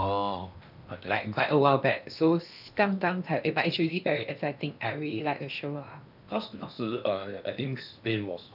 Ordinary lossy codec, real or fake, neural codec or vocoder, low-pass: none; fake; codec, 16 kHz in and 24 kHz out, 0.8 kbps, FocalCodec, streaming, 65536 codes; 5.4 kHz